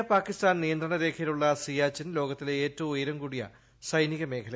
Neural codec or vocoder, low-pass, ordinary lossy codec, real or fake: none; none; none; real